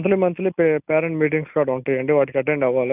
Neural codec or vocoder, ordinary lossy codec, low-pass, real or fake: none; none; 3.6 kHz; real